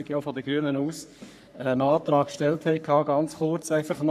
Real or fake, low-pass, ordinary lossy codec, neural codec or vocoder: fake; 14.4 kHz; none; codec, 44.1 kHz, 3.4 kbps, Pupu-Codec